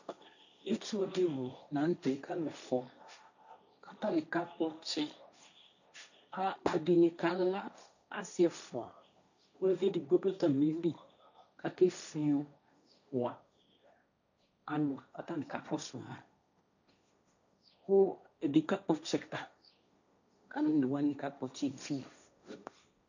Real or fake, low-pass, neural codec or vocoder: fake; 7.2 kHz; codec, 16 kHz, 1.1 kbps, Voila-Tokenizer